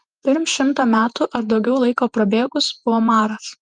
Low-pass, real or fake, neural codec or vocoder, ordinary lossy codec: 9.9 kHz; fake; vocoder, 48 kHz, 128 mel bands, Vocos; Opus, 32 kbps